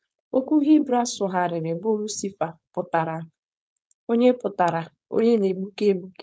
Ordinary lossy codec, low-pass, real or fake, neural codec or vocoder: none; none; fake; codec, 16 kHz, 4.8 kbps, FACodec